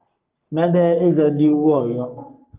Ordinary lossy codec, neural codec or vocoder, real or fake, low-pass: Opus, 32 kbps; codec, 44.1 kHz, 3.4 kbps, Pupu-Codec; fake; 3.6 kHz